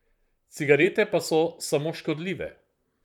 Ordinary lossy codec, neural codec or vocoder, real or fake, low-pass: none; vocoder, 44.1 kHz, 128 mel bands, Pupu-Vocoder; fake; 19.8 kHz